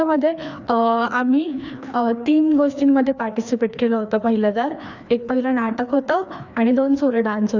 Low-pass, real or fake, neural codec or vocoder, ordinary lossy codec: 7.2 kHz; fake; codec, 16 kHz, 2 kbps, FreqCodec, larger model; none